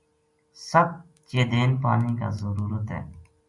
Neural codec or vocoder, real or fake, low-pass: none; real; 10.8 kHz